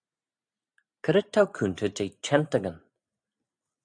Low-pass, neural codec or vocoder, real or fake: 9.9 kHz; none; real